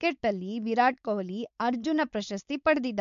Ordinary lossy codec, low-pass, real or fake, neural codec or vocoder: MP3, 64 kbps; 7.2 kHz; fake; codec, 16 kHz, 4.8 kbps, FACodec